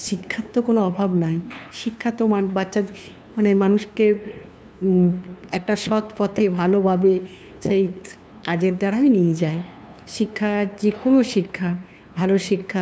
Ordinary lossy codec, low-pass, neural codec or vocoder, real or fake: none; none; codec, 16 kHz, 2 kbps, FunCodec, trained on LibriTTS, 25 frames a second; fake